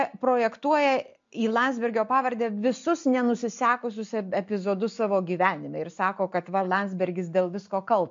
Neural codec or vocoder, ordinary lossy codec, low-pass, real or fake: none; MP3, 48 kbps; 7.2 kHz; real